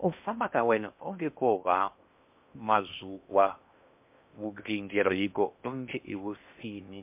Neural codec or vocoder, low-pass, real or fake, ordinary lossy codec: codec, 16 kHz in and 24 kHz out, 0.6 kbps, FocalCodec, streaming, 4096 codes; 3.6 kHz; fake; MP3, 32 kbps